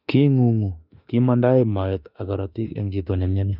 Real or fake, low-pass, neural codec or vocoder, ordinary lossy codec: fake; 5.4 kHz; autoencoder, 48 kHz, 32 numbers a frame, DAC-VAE, trained on Japanese speech; none